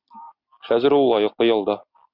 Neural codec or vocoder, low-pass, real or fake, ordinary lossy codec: none; 5.4 kHz; real; Opus, 64 kbps